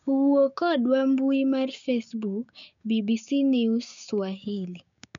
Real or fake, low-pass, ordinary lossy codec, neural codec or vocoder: fake; 7.2 kHz; MP3, 64 kbps; codec, 16 kHz, 6 kbps, DAC